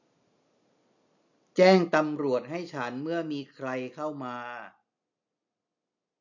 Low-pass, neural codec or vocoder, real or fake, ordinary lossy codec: 7.2 kHz; none; real; none